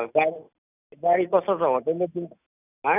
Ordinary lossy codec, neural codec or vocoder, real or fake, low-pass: none; none; real; 3.6 kHz